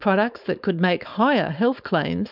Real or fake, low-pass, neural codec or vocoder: fake; 5.4 kHz; codec, 16 kHz, 4.8 kbps, FACodec